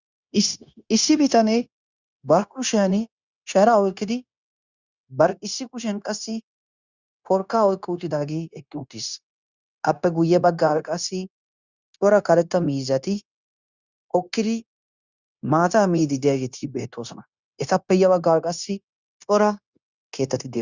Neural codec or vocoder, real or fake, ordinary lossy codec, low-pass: codec, 16 kHz, 0.9 kbps, LongCat-Audio-Codec; fake; Opus, 64 kbps; 7.2 kHz